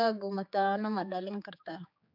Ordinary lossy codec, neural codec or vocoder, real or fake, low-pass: AAC, 48 kbps; codec, 16 kHz, 4 kbps, X-Codec, HuBERT features, trained on general audio; fake; 5.4 kHz